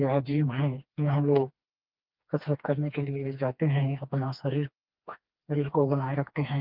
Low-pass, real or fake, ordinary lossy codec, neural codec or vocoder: 5.4 kHz; fake; Opus, 24 kbps; codec, 16 kHz, 2 kbps, FreqCodec, smaller model